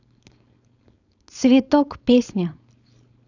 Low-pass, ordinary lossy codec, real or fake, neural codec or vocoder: 7.2 kHz; none; fake; codec, 16 kHz, 4.8 kbps, FACodec